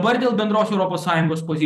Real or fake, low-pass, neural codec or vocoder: fake; 14.4 kHz; vocoder, 44.1 kHz, 128 mel bands every 256 samples, BigVGAN v2